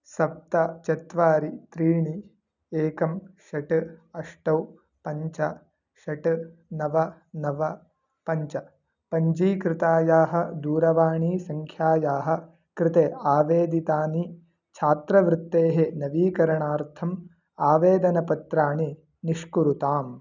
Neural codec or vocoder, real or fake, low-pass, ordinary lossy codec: none; real; 7.2 kHz; none